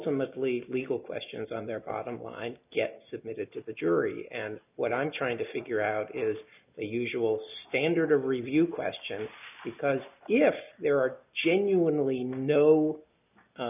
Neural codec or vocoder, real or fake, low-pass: none; real; 3.6 kHz